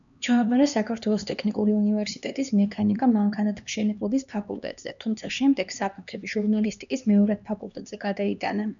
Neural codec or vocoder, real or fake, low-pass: codec, 16 kHz, 2 kbps, X-Codec, HuBERT features, trained on LibriSpeech; fake; 7.2 kHz